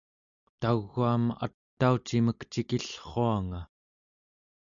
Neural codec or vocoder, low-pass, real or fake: none; 7.2 kHz; real